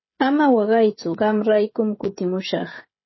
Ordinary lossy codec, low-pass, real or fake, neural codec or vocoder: MP3, 24 kbps; 7.2 kHz; fake; codec, 16 kHz, 16 kbps, FreqCodec, smaller model